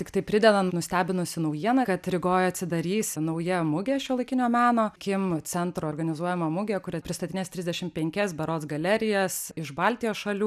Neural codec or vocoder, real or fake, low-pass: none; real; 14.4 kHz